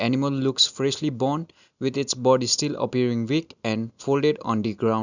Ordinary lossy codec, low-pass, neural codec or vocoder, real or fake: none; 7.2 kHz; none; real